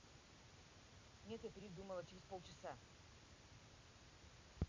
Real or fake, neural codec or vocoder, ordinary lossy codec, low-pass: real; none; MP3, 64 kbps; 7.2 kHz